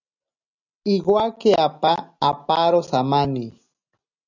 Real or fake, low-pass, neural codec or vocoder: real; 7.2 kHz; none